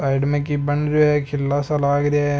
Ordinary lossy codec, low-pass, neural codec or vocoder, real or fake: none; none; none; real